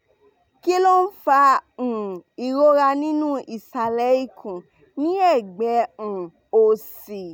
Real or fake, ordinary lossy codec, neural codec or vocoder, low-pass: real; none; none; none